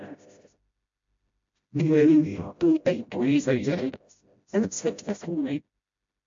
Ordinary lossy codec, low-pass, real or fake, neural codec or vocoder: MP3, 48 kbps; 7.2 kHz; fake; codec, 16 kHz, 0.5 kbps, FreqCodec, smaller model